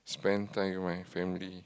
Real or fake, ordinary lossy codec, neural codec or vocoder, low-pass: real; none; none; none